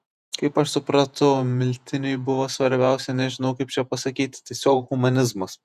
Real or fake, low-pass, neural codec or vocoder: fake; 14.4 kHz; vocoder, 48 kHz, 128 mel bands, Vocos